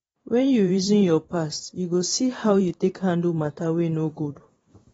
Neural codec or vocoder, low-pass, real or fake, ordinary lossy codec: vocoder, 44.1 kHz, 128 mel bands every 512 samples, BigVGAN v2; 19.8 kHz; fake; AAC, 24 kbps